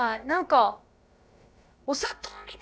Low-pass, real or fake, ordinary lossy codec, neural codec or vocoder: none; fake; none; codec, 16 kHz, about 1 kbps, DyCAST, with the encoder's durations